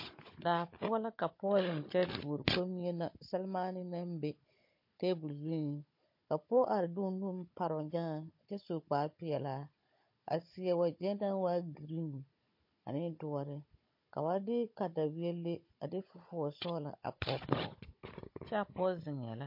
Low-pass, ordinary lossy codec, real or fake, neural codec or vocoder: 5.4 kHz; MP3, 32 kbps; fake; codec, 16 kHz, 16 kbps, FunCodec, trained on Chinese and English, 50 frames a second